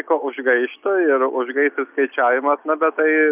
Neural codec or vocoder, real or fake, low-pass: none; real; 3.6 kHz